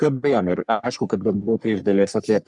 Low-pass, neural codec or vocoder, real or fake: 10.8 kHz; codec, 44.1 kHz, 3.4 kbps, Pupu-Codec; fake